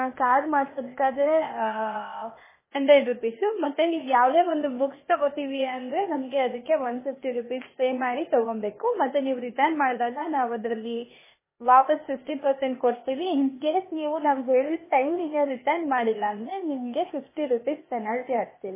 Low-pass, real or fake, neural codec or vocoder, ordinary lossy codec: 3.6 kHz; fake; codec, 16 kHz, 0.8 kbps, ZipCodec; MP3, 16 kbps